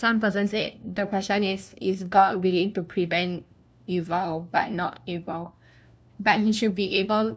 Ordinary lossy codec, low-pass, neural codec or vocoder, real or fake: none; none; codec, 16 kHz, 1 kbps, FunCodec, trained on LibriTTS, 50 frames a second; fake